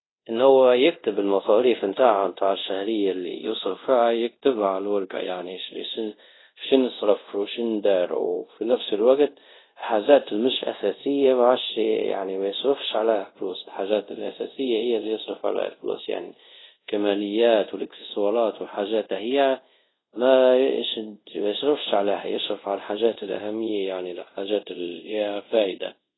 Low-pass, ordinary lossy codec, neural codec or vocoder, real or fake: 7.2 kHz; AAC, 16 kbps; codec, 24 kHz, 0.5 kbps, DualCodec; fake